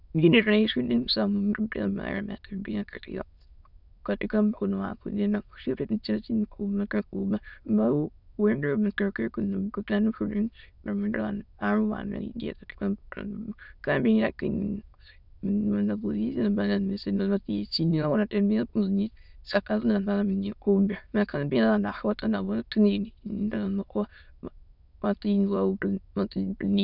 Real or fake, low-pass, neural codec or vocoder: fake; 5.4 kHz; autoencoder, 22.05 kHz, a latent of 192 numbers a frame, VITS, trained on many speakers